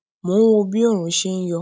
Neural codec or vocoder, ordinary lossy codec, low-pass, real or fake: none; none; none; real